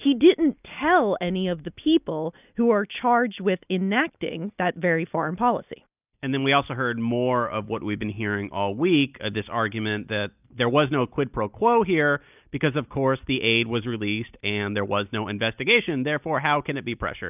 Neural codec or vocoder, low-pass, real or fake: none; 3.6 kHz; real